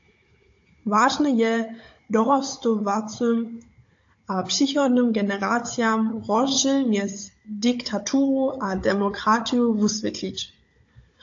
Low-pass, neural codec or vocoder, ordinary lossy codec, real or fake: 7.2 kHz; codec, 16 kHz, 16 kbps, FunCodec, trained on Chinese and English, 50 frames a second; AAC, 48 kbps; fake